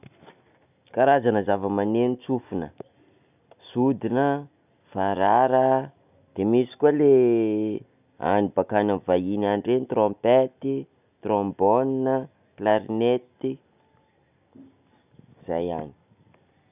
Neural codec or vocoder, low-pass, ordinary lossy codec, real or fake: none; 3.6 kHz; none; real